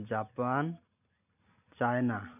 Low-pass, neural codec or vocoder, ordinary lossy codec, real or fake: 3.6 kHz; none; none; real